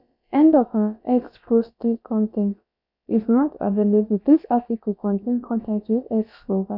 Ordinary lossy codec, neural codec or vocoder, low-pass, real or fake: none; codec, 16 kHz, about 1 kbps, DyCAST, with the encoder's durations; 5.4 kHz; fake